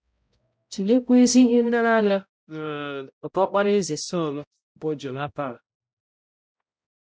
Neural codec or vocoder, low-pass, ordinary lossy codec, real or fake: codec, 16 kHz, 0.5 kbps, X-Codec, HuBERT features, trained on balanced general audio; none; none; fake